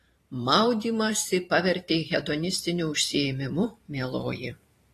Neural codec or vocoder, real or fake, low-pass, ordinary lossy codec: vocoder, 44.1 kHz, 128 mel bands every 512 samples, BigVGAN v2; fake; 14.4 kHz; AAC, 48 kbps